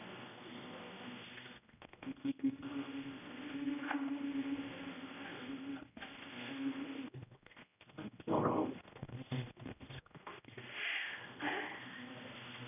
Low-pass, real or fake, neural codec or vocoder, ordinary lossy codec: 3.6 kHz; fake; codec, 16 kHz, 1 kbps, X-Codec, HuBERT features, trained on general audio; none